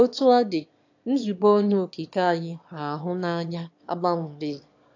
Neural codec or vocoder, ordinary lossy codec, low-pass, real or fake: autoencoder, 22.05 kHz, a latent of 192 numbers a frame, VITS, trained on one speaker; AAC, 48 kbps; 7.2 kHz; fake